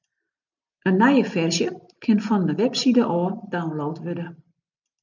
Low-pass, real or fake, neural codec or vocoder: 7.2 kHz; real; none